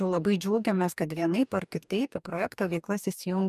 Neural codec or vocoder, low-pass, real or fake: codec, 44.1 kHz, 2.6 kbps, DAC; 14.4 kHz; fake